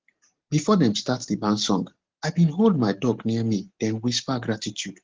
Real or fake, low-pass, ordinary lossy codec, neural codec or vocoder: real; 7.2 kHz; Opus, 16 kbps; none